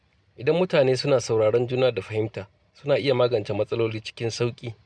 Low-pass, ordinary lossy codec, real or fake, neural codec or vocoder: none; none; real; none